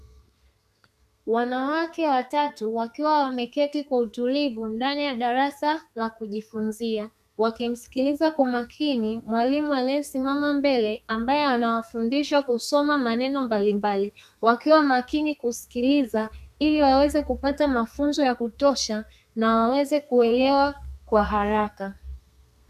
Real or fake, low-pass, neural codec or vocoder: fake; 14.4 kHz; codec, 32 kHz, 1.9 kbps, SNAC